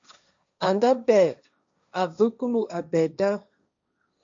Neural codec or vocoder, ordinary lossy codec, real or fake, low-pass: codec, 16 kHz, 1.1 kbps, Voila-Tokenizer; AAC, 64 kbps; fake; 7.2 kHz